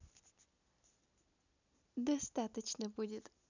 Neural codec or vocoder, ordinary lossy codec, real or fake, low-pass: none; none; real; 7.2 kHz